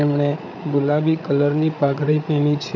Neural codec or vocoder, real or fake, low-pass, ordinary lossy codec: codec, 16 kHz, 8 kbps, FreqCodec, larger model; fake; 7.2 kHz; none